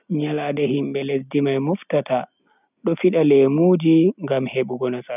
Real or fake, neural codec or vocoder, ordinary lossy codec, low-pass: real; none; none; 3.6 kHz